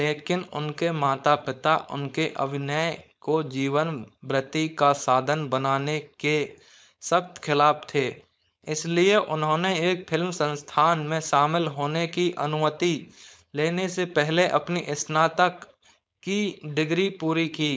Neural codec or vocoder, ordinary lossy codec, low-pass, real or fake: codec, 16 kHz, 4.8 kbps, FACodec; none; none; fake